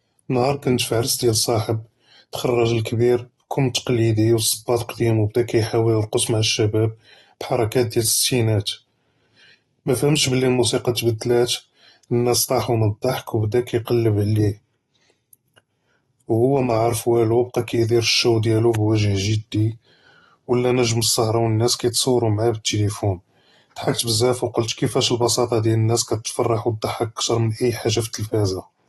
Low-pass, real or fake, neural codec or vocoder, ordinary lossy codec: 19.8 kHz; real; none; AAC, 32 kbps